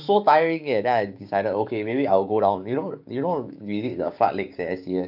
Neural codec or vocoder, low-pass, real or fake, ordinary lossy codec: codec, 16 kHz, 6 kbps, DAC; 5.4 kHz; fake; AAC, 48 kbps